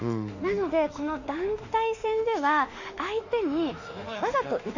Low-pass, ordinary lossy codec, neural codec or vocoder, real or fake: 7.2 kHz; none; autoencoder, 48 kHz, 32 numbers a frame, DAC-VAE, trained on Japanese speech; fake